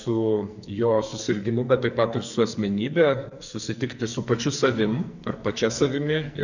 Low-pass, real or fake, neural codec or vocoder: 7.2 kHz; fake; codec, 44.1 kHz, 2.6 kbps, SNAC